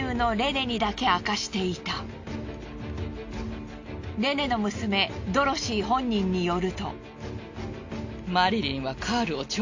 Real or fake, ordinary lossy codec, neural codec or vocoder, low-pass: real; AAC, 48 kbps; none; 7.2 kHz